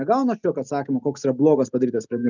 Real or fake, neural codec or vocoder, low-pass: real; none; 7.2 kHz